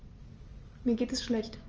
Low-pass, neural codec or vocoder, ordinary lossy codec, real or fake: 7.2 kHz; none; Opus, 24 kbps; real